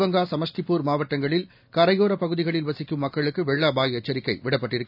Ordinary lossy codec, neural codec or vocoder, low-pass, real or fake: none; none; 5.4 kHz; real